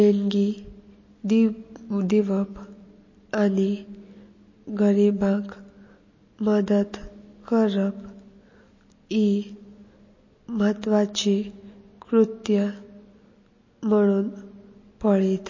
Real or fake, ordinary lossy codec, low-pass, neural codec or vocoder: real; MP3, 32 kbps; 7.2 kHz; none